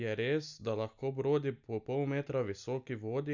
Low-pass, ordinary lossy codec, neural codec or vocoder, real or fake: 7.2 kHz; none; none; real